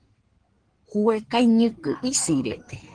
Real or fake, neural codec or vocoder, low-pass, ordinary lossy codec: fake; codec, 16 kHz in and 24 kHz out, 2.2 kbps, FireRedTTS-2 codec; 9.9 kHz; Opus, 16 kbps